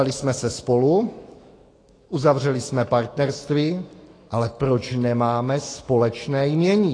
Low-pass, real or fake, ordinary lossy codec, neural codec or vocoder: 9.9 kHz; fake; AAC, 32 kbps; autoencoder, 48 kHz, 128 numbers a frame, DAC-VAE, trained on Japanese speech